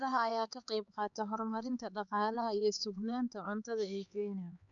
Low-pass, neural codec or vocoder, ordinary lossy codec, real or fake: 7.2 kHz; codec, 16 kHz, 4 kbps, X-Codec, HuBERT features, trained on LibriSpeech; none; fake